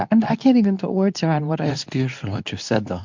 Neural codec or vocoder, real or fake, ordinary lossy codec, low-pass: codec, 24 kHz, 0.9 kbps, WavTokenizer, medium speech release version 2; fake; MP3, 64 kbps; 7.2 kHz